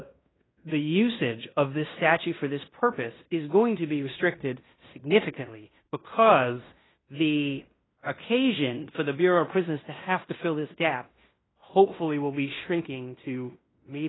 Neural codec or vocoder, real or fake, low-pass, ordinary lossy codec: codec, 16 kHz in and 24 kHz out, 0.9 kbps, LongCat-Audio-Codec, fine tuned four codebook decoder; fake; 7.2 kHz; AAC, 16 kbps